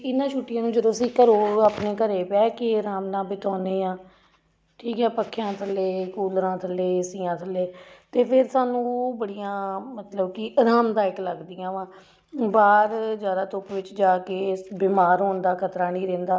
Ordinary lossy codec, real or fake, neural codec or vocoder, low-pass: none; real; none; none